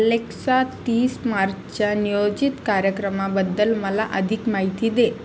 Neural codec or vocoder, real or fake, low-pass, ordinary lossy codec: none; real; none; none